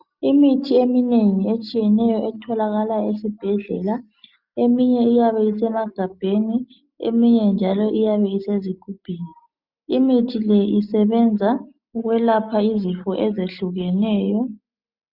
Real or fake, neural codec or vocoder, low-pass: real; none; 5.4 kHz